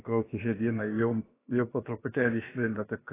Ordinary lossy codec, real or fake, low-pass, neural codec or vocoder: AAC, 16 kbps; fake; 3.6 kHz; codec, 16 kHz, about 1 kbps, DyCAST, with the encoder's durations